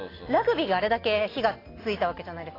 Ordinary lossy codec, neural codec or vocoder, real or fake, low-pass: AAC, 24 kbps; none; real; 5.4 kHz